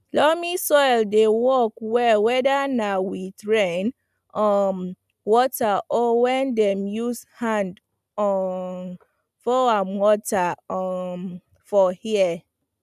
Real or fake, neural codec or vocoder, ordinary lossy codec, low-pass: real; none; none; 14.4 kHz